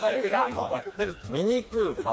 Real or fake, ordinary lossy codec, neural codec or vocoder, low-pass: fake; none; codec, 16 kHz, 2 kbps, FreqCodec, smaller model; none